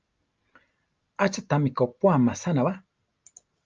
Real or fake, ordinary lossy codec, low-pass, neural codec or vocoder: real; Opus, 24 kbps; 7.2 kHz; none